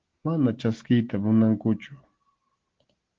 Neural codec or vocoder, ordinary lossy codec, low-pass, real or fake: none; Opus, 16 kbps; 7.2 kHz; real